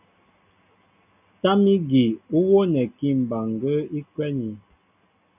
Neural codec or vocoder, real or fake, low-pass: none; real; 3.6 kHz